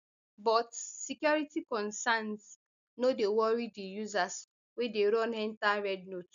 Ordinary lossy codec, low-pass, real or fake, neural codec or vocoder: none; 7.2 kHz; real; none